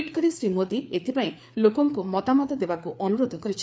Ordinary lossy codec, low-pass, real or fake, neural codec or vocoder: none; none; fake; codec, 16 kHz, 4 kbps, FreqCodec, larger model